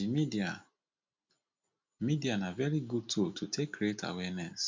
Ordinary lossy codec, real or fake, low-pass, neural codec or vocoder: MP3, 64 kbps; real; 7.2 kHz; none